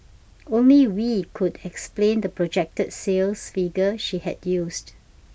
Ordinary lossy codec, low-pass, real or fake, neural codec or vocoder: none; none; real; none